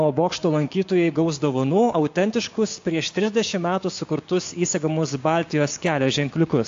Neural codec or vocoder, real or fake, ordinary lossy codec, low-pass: codec, 16 kHz, 6 kbps, DAC; fake; AAC, 48 kbps; 7.2 kHz